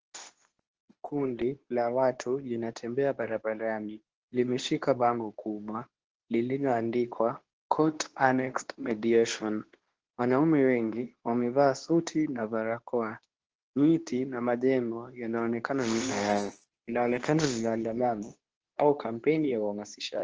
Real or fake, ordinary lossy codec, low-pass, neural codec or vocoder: fake; Opus, 16 kbps; 7.2 kHz; codec, 24 kHz, 0.9 kbps, WavTokenizer, medium speech release version 2